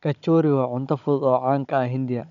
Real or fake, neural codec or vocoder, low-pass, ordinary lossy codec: fake; codec, 16 kHz, 16 kbps, FunCodec, trained on Chinese and English, 50 frames a second; 7.2 kHz; none